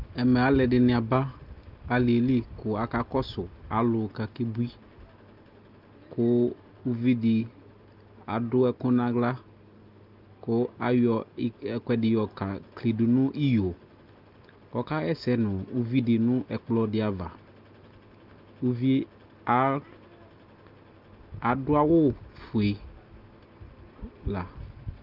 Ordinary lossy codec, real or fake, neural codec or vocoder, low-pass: Opus, 32 kbps; real; none; 5.4 kHz